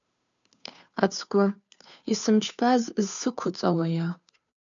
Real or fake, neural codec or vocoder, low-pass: fake; codec, 16 kHz, 2 kbps, FunCodec, trained on Chinese and English, 25 frames a second; 7.2 kHz